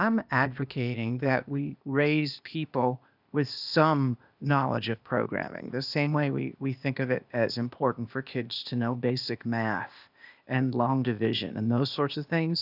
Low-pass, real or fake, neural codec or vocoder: 5.4 kHz; fake; codec, 16 kHz, 0.8 kbps, ZipCodec